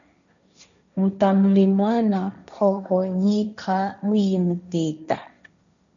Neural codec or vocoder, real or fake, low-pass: codec, 16 kHz, 1.1 kbps, Voila-Tokenizer; fake; 7.2 kHz